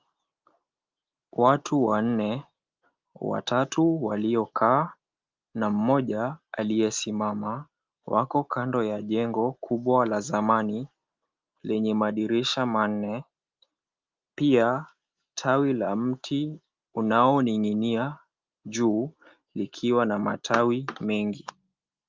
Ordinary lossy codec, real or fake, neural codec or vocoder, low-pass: Opus, 32 kbps; real; none; 7.2 kHz